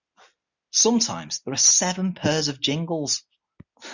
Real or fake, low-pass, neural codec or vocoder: real; 7.2 kHz; none